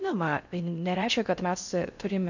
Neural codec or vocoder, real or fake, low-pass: codec, 16 kHz in and 24 kHz out, 0.6 kbps, FocalCodec, streaming, 4096 codes; fake; 7.2 kHz